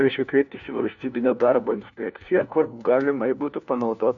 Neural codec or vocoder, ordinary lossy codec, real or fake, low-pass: codec, 16 kHz, 1 kbps, FunCodec, trained on LibriTTS, 50 frames a second; MP3, 64 kbps; fake; 7.2 kHz